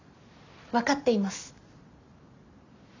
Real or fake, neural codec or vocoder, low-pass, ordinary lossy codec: real; none; 7.2 kHz; none